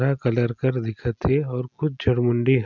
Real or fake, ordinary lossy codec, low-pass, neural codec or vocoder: real; none; 7.2 kHz; none